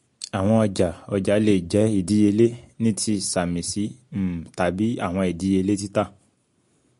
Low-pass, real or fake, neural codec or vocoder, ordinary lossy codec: 10.8 kHz; real; none; MP3, 48 kbps